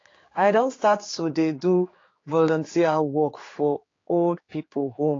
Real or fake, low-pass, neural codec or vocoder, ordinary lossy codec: fake; 7.2 kHz; codec, 16 kHz, 4 kbps, X-Codec, HuBERT features, trained on general audio; AAC, 32 kbps